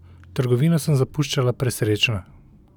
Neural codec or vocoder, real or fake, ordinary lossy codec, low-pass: none; real; none; 19.8 kHz